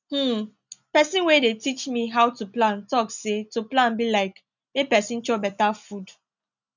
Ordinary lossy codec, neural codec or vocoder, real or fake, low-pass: none; none; real; 7.2 kHz